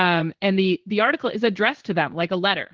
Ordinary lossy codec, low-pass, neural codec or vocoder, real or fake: Opus, 16 kbps; 7.2 kHz; codec, 16 kHz in and 24 kHz out, 1 kbps, XY-Tokenizer; fake